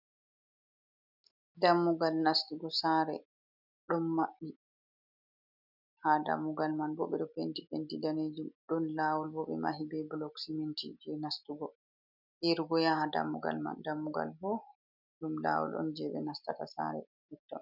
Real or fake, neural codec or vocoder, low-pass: real; none; 5.4 kHz